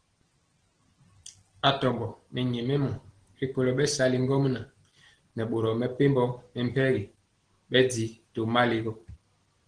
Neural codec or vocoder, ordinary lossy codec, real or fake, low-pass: none; Opus, 16 kbps; real; 9.9 kHz